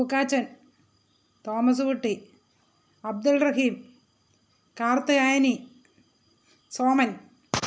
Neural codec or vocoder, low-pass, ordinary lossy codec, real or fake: none; none; none; real